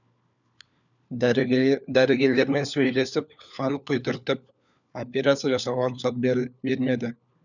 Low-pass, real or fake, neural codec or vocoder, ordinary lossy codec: 7.2 kHz; fake; codec, 16 kHz, 4 kbps, FunCodec, trained on LibriTTS, 50 frames a second; none